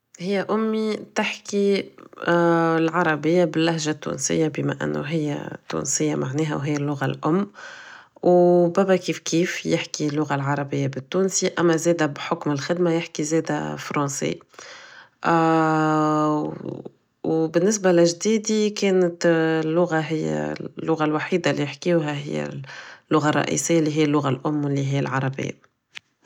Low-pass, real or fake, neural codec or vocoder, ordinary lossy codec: 19.8 kHz; real; none; none